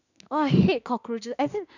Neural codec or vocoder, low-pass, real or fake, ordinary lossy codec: autoencoder, 48 kHz, 32 numbers a frame, DAC-VAE, trained on Japanese speech; 7.2 kHz; fake; none